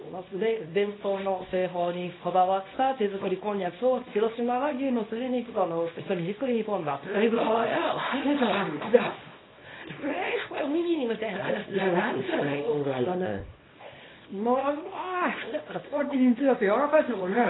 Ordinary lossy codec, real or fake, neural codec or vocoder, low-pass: AAC, 16 kbps; fake; codec, 24 kHz, 0.9 kbps, WavTokenizer, small release; 7.2 kHz